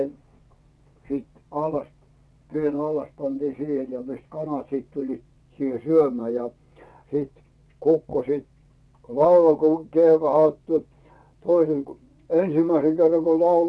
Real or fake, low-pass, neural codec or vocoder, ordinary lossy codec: fake; none; vocoder, 22.05 kHz, 80 mel bands, WaveNeXt; none